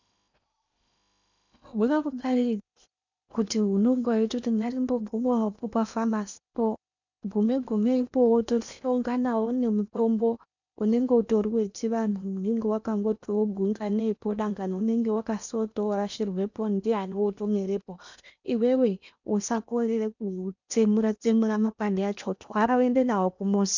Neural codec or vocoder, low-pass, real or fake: codec, 16 kHz in and 24 kHz out, 0.8 kbps, FocalCodec, streaming, 65536 codes; 7.2 kHz; fake